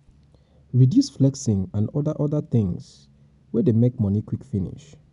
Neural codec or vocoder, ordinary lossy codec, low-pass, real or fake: none; none; 10.8 kHz; real